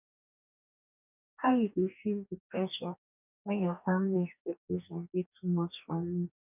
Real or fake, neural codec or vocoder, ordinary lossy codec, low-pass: fake; codec, 44.1 kHz, 2.6 kbps, DAC; none; 3.6 kHz